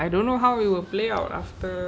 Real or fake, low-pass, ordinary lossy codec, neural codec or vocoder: real; none; none; none